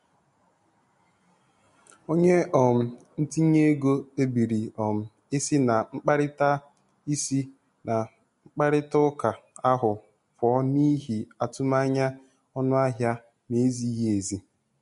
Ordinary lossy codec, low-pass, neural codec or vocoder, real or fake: MP3, 48 kbps; 14.4 kHz; none; real